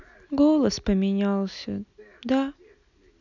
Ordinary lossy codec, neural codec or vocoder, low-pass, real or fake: none; none; 7.2 kHz; real